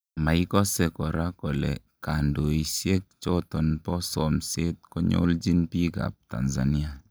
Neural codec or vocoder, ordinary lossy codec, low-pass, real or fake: none; none; none; real